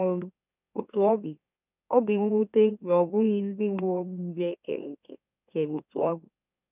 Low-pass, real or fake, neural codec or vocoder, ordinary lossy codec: 3.6 kHz; fake; autoencoder, 44.1 kHz, a latent of 192 numbers a frame, MeloTTS; none